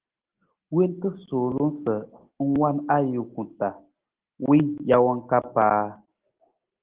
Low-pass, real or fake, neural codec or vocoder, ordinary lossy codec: 3.6 kHz; real; none; Opus, 16 kbps